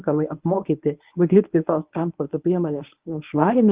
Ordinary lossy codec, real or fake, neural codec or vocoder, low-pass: Opus, 32 kbps; fake; codec, 24 kHz, 0.9 kbps, WavTokenizer, medium speech release version 1; 3.6 kHz